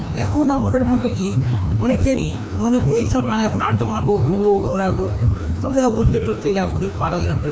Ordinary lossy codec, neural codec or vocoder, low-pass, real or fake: none; codec, 16 kHz, 1 kbps, FreqCodec, larger model; none; fake